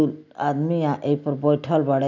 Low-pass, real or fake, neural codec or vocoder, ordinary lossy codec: 7.2 kHz; real; none; none